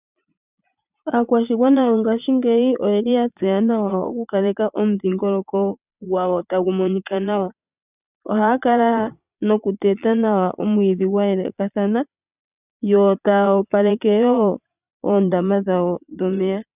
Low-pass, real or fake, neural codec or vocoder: 3.6 kHz; fake; vocoder, 22.05 kHz, 80 mel bands, Vocos